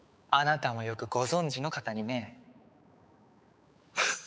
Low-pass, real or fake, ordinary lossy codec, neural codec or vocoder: none; fake; none; codec, 16 kHz, 4 kbps, X-Codec, HuBERT features, trained on balanced general audio